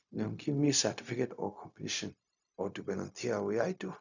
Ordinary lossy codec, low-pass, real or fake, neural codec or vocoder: none; 7.2 kHz; fake; codec, 16 kHz, 0.4 kbps, LongCat-Audio-Codec